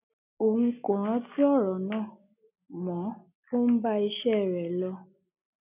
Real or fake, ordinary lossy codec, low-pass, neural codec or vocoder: real; none; 3.6 kHz; none